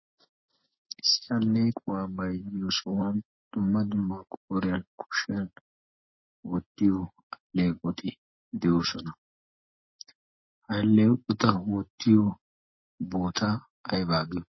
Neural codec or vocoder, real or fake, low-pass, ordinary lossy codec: none; real; 7.2 kHz; MP3, 24 kbps